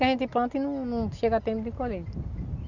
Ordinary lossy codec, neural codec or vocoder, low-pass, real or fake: none; none; 7.2 kHz; real